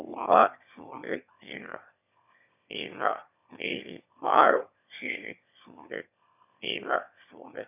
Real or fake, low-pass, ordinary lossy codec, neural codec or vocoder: fake; 3.6 kHz; none; autoencoder, 22.05 kHz, a latent of 192 numbers a frame, VITS, trained on one speaker